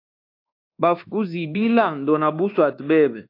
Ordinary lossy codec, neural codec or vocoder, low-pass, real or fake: AAC, 32 kbps; codec, 24 kHz, 1.2 kbps, DualCodec; 5.4 kHz; fake